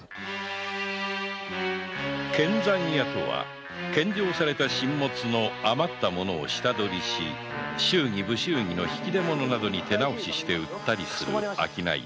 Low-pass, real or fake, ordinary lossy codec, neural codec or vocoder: none; real; none; none